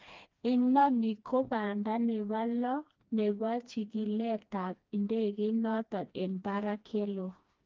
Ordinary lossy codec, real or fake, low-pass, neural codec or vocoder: Opus, 32 kbps; fake; 7.2 kHz; codec, 16 kHz, 2 kbps, FreqCodec, smaller model